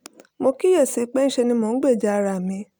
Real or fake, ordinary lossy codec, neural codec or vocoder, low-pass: real; none; none; none